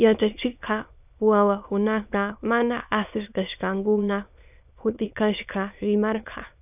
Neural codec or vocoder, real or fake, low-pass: autoencoder, 22.05 kHz, a latent of 192 numbers a frame, VITS, trained on many speakers; fake; 3.6 kHz